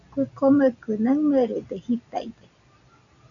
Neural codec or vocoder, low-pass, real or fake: none; 7.2 kHz; real